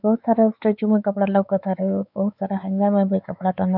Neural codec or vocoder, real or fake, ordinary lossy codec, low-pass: codec, 16 kHz, 4 kbps, X-Codec, WavLM features, trained on Multilingual LibriSpeech; fake; none; 5.4 kHz